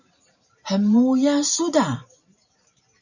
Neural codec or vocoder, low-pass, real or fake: vocoder, 44.1 kHz, 128 mel bands every 512 samples, BigVGAN v2; 7.2 kHz; fake